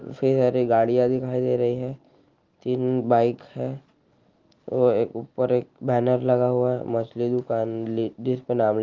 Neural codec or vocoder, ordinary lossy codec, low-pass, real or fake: none; Opus, 32 kbps; 7.2 kHz; real